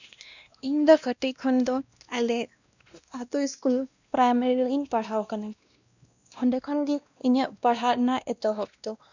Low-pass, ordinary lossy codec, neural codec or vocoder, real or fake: 7.2 kHz; none; codec, 16 kHz, 1 kbps, X-Codec, WavLM features, trained on Multilingual LibriSpeech; fake